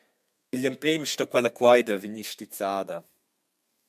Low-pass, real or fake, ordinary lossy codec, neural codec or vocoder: 14.4 kHz; fake; MP3, 96 kbps; codec, 32 kHz, 1.9 kbps, SNAC